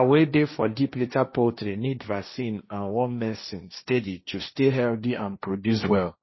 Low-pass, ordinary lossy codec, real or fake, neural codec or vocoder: 7.2 kHz; MP3, 24 kbps; fake; codec, 16 kHz, 1.1 kbps, Voila-Tokenizer